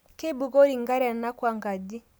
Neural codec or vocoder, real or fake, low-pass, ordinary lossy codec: none; real; none; none